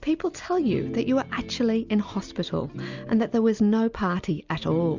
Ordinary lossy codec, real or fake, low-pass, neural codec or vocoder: Opus, 64 kbps; real; 7.2 kHz; none